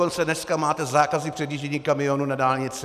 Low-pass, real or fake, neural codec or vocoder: 14.4 kHz; real; none